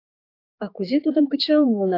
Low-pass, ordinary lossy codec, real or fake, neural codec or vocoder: 5.4 kHz; AAC, 32 kbps; fake; codec, 16 kHz, 4 kbps, X-Codec, HuBERT features, trained on general audio